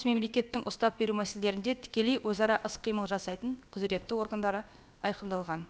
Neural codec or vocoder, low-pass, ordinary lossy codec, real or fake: codec, 16 kHz, about 1 kbps, DyCAST, with the encoder's durations; none; none; fake